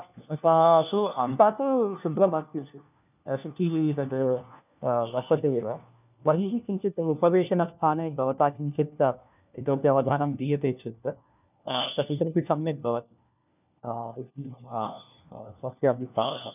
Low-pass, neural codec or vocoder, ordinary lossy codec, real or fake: 3.6 kHz; codec, 16 kHz, 1 kbps, FunCodec, trained on LibriTTS, 50 frames a second; none; fake